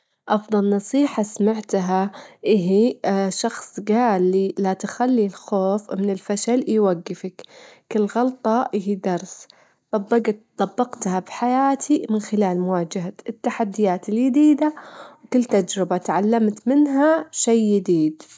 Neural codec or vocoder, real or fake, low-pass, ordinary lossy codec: none; real; none; none